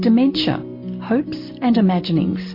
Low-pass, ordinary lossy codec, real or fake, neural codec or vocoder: 5.4 kHz; MP3, 32 kbps; real; none